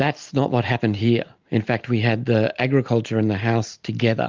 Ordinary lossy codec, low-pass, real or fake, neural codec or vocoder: Opus, 32 kbps; 7.2 kHz; real; none